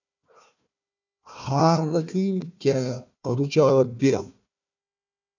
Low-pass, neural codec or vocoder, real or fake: 7.2 kHz; codec, 16 kHz, 1 kbps, FunCodec, trained on Chinese and English, 50 frames a second; fake